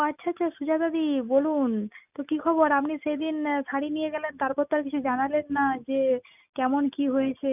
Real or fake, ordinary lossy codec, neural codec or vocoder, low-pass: real; none; none; 3.6 kHz